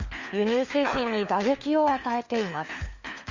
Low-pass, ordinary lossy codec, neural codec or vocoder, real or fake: 7.2 kHz; Opus, 64 kbps; codec, 16 kHz, 4 kbps, FunCodec, trained on LibriTTS, 50 frames a second; fake